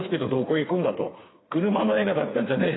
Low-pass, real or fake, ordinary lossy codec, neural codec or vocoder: 7.2 kHz; fake; AAC, 16 kbps; codec, 16 kHz, 4 kbps, FreqCodec, smaller model